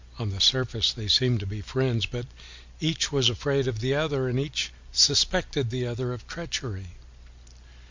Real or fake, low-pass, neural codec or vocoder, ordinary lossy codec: real; 7.2 kHz; none; MP3, 64 kbps